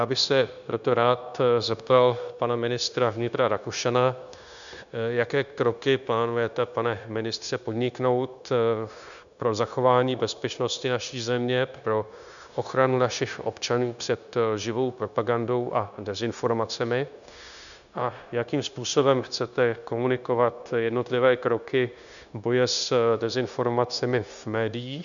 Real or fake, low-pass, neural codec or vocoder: fake; 7.2 kHz; codec, 16 kHz, 0.9 kbps, LongCat-Audio-Codec